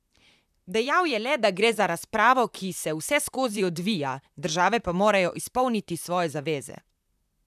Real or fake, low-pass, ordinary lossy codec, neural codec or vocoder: fake; 14.4 kHz; none; vocoder, 44.1 kHz, 128 mel bands, Pupu-Vocoder